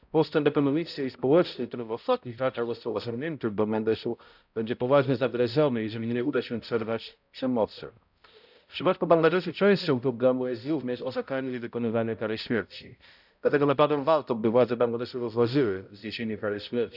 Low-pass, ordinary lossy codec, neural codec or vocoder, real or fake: 5.4 kHz; none; codec, 16 kHz, 0.5 kbps, X-Codec, HuBERT features, trained on balanced general audio; fake